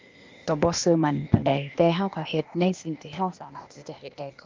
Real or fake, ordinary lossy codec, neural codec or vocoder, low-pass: fake; Opus, 32 kbps; codec, 16 kHz, 0.8 kbps, ZipCodec; 7.2 kHz